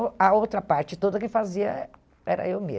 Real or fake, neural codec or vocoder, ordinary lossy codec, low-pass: real; none; none; none